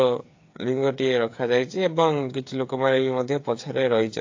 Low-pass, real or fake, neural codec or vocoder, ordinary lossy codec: 7.2 kHz; fake; codec, 16 kHz, 8 kbps, FreqCodec, smaller model; AAC, 48 kbps